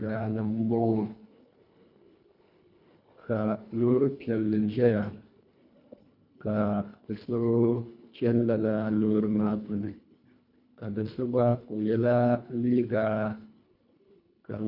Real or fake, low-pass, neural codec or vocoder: fake; 5.4 kHz; codec, 24 kHz, 1.5 kbps, HILCodec